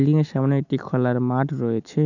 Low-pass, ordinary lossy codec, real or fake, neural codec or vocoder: 7.2 kHz; none; real; none